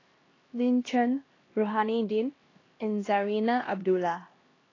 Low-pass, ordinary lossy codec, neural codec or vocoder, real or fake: 7.2 kHz; AAC, 32 kbps; codec, 16 kHz, 1 kbps, X-Codec, HuBERT features, trained on LibriSpeech; fake